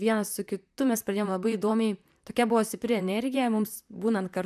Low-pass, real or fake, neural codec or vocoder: 14.4 kHz; fake; vocoder, 44.1 kHz, 128 mel bands, Pupu-Vocoder